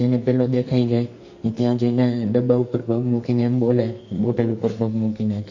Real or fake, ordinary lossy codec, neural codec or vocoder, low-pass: fake; Opus, 64 kbps; codec, 44.1 kHz, 2.6 kbps, SNAC; 7.2 kHz